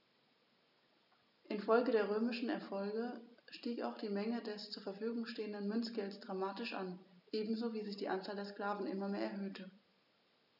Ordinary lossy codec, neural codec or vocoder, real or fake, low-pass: none; none; real; 5.4 kHz